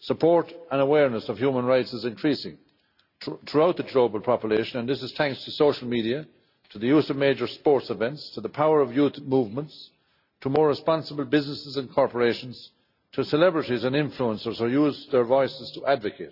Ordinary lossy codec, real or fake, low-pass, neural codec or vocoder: none; real; 5.4 kHz; none